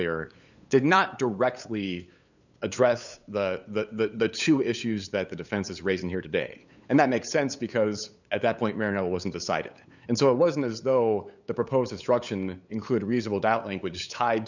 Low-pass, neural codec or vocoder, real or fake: 7.2 kHz; codec, 16 kHz, 8 kbps, FunCodec, trained on LibriTTS, 25 frames a second; fake